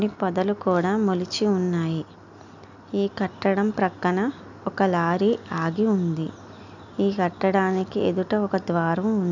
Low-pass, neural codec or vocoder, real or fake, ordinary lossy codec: 7.2 kHz; none; real; none